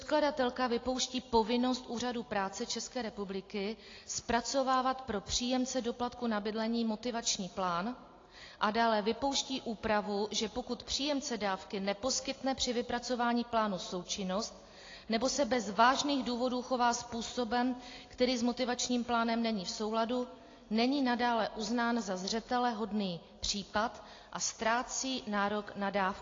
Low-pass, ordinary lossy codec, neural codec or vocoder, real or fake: 7.2 kHz; AAC, 32 kbps; none; real